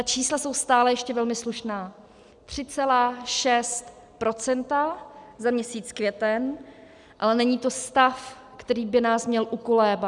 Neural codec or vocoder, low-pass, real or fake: none; 10.8 kHz; real